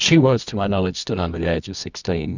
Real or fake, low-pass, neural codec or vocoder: fake; 7.2 kHz; codec, 24 kHz, 0.9 kbps, WavTokenizer, medium music audio release